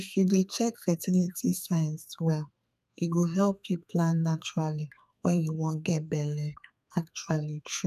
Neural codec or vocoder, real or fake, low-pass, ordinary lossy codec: codec, 32 kHz, 1.9 kbps, SNAC; fake; 14.4 kHz; none